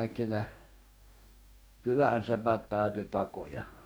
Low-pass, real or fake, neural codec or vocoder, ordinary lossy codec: none; fake; codec, 44.1 kHz, 2.6 kbps, DAC; none